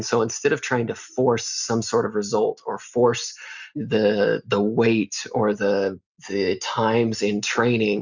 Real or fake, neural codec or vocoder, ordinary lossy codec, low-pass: real; none; Opus, 64 kbps; 7.2 kHz